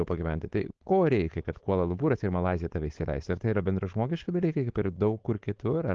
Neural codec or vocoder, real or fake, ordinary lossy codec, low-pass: codec, 16 kHz, 4.8 kbps, FACodec; fake; Opus, 16 kbps; 7.2 kHz